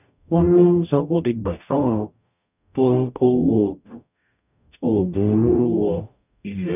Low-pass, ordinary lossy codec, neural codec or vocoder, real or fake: 3.6 kHz; none; codec, 44.1 kHz, 0.9 kbps, DAC; fake